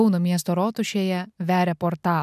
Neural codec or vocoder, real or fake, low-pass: none; real; 14.4 kHz